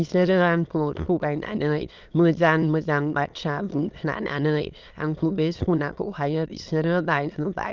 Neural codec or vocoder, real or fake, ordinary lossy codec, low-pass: autoencoder, 22.05 kHz, a latent of 192 numbers a frame, VITS, trained on many speakers; fake; Opus, 24 kbps; 7.2 kHz